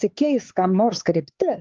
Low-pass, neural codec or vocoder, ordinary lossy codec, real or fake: 7.2 kHz; codec, 16 kHz, 4 kbps, X-Codec, HuBERT features, trained on LibriSpeech; Opus, 24 kbps; fake